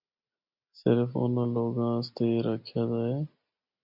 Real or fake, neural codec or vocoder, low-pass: real; none; 5.4 kHz